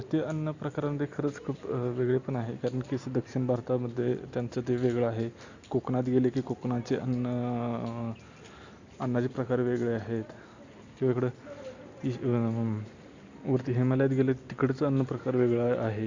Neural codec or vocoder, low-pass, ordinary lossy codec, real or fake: none; 7.2 kHz; Opus, 64 kbps; real